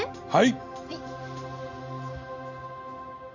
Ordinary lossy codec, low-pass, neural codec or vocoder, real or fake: Opus, 64 kbps; 7.2 kHz; none; real